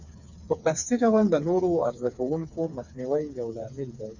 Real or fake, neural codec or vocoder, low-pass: fake; codec, 16 kHz, 4 kbps, FreqCodec, smaller model; 7.2 kHz